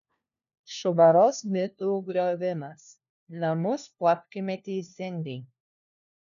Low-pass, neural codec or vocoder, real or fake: 7.2 kHz; codec, 16 kHz, 1 kbps, FunCodec, trained on LibriTTS, 50 frames a second; fake